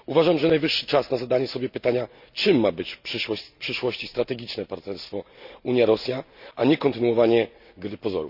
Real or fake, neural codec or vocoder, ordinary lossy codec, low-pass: real; none; none; 5.4 kHz